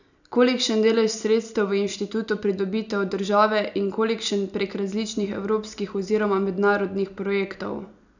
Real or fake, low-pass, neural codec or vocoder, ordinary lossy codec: real; 7.2 kHz; none; none